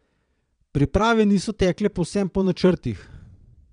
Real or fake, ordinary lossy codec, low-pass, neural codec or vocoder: fake; none; 9.9 kHz; vocoder, 22.05 kHz, 80 mel bands, Vocos